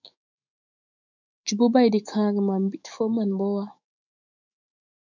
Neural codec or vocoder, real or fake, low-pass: autoencoder, 48 kHz, 128 numbers a frame, DAC-VAE, trained on Japanese speech; fake; 7.2 kHz